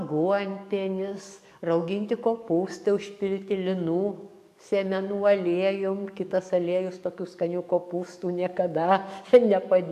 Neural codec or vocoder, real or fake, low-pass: codec, 44.1 kHz, 7.8 kbps, DAC; fake; 14.4 kHz